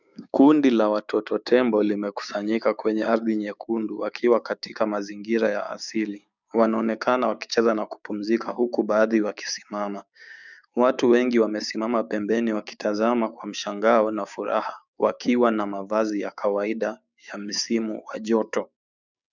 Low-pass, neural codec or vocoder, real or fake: 7.2 kHz; codec, 16 kHz, 6 kbps, DAC; fake